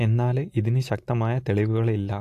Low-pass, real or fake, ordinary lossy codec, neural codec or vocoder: 14.4 kHz; real; AAC, 64 kbps; none